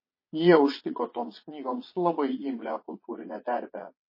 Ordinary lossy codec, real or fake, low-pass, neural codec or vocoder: MP3, 24 kbps; fake; 5.4 kHz; vocoder, 22.05 kHz, 80 mel bands, Vocos